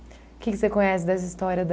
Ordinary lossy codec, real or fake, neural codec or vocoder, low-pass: none; real; none; none